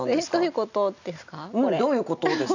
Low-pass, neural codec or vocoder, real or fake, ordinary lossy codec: 7.2 kHz; none; real; none